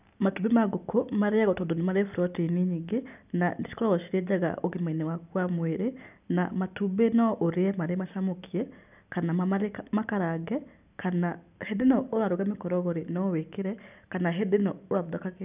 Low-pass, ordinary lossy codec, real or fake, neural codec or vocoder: 3.6 kHz; none; real; none